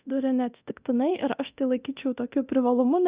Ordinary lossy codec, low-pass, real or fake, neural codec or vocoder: Opus, 64 kbps; 3.6 kHz; fake; codec, 24 kHz, 0.9 kbps, DualCodec